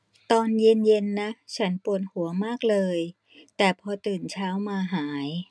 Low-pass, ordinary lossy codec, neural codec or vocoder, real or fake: none; none; none; real